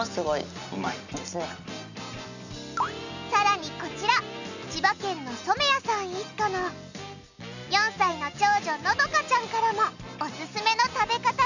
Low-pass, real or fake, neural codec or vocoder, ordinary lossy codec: 7.2 kHz; real; none; none